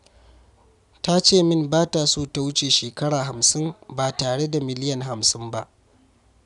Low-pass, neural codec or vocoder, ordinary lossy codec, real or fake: 10.8 kHz; none; none; real